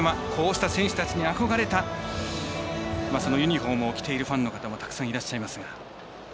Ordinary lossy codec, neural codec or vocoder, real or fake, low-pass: none; none; real; none